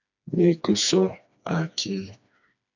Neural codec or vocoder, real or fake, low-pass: codec, 16 kHz, 2 kbps, FreqCodec, smaller model; fake; 7.2 kHz